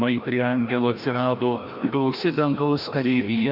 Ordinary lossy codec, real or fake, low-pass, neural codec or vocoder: Opus, 64 kbps; fake; 5.4 kHz; codec, 16 kHz, 1 kbps, FreqCodec, larger model